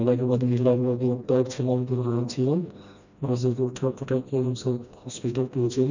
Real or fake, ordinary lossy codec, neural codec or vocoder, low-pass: fake; none; codec, 16 kHz, 1 kbps, FreqCodec, smaller model; 7.2 kHz